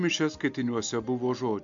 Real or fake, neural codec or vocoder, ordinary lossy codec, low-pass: real; none; MP3, 64 kbps; 7.2 kHz